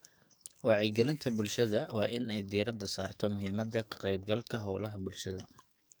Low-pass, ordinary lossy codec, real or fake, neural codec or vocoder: none; none; fake; codec, 44.1 kHz, 2.6 kbps, SNAC